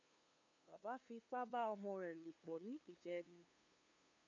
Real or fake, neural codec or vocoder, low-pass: fake; codec, 16 kHz, 2 kbps, FunCodec, trained on LibriTTS, 25 frames a second; 7.2 kHz